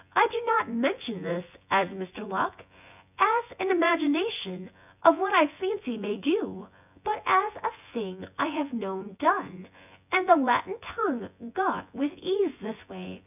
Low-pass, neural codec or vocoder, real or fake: 3.6 kHz; vocoder, 24 kHz, 100 mel bands, Vocos; fake